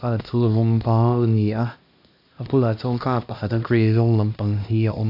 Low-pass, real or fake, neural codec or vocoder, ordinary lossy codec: 5.4 kHz; fake; codec, 16 kHz, 1 kbps, X-Codec, HuBERT features, trained on LibriSpeech; AAC, 48 kbps